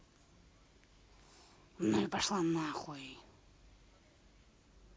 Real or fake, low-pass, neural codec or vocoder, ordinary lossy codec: real; none; none; none